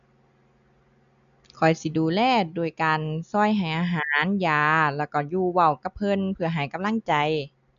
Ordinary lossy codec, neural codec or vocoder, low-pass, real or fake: none; none; 7.2 kHz; real